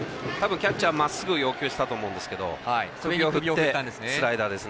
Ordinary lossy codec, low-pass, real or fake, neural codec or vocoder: none; none; real; none